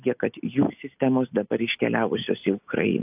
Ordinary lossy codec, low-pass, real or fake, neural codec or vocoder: AAC, 32 kbps; 3.6 kHz; real; none